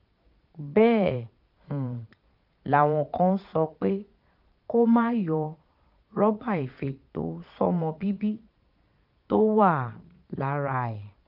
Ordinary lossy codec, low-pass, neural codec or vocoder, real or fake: none; 5.4 kHz; vocoder, 22.05 kHz, 80 mel bands, Vocos; fake